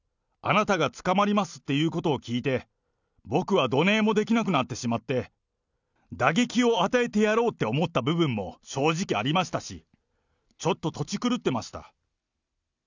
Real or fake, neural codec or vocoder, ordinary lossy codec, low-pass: real; none; none; 7.2 kHz